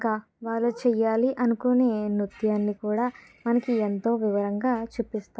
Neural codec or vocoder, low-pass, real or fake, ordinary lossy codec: none; none; real; none